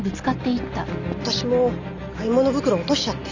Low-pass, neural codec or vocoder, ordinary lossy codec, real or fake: 7.2 kHz; none; none; real